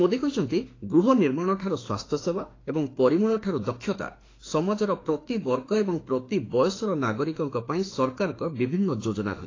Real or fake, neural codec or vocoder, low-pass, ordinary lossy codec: fake; autoencoder, 48 kHz, 32 numbers a frame, DAC-VAE, trained on Japanese speech; 7.2 kHz; AAC, 32 kbps